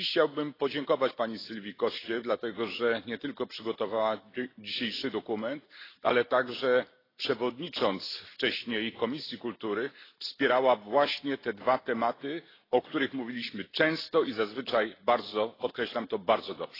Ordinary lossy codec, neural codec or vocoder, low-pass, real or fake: AAC, 24 kbps; none; 5.4 kHz; real